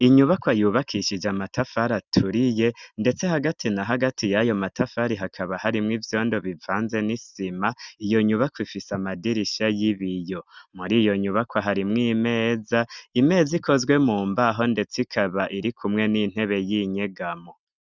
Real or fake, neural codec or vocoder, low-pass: real; none; 7.2 kHz